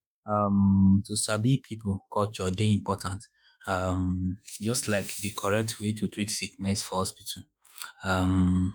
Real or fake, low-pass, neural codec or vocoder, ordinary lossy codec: fake; none; autoencoder, 48 kHz, 32 numbers a frame, DAC-VAE, trained on Japanese speech; none